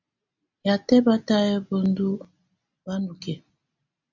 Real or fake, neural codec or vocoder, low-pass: real; none; 7.2 kHz